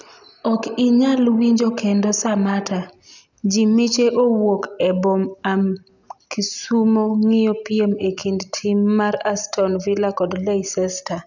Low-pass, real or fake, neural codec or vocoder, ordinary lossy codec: 7.2 kHz; real; none; none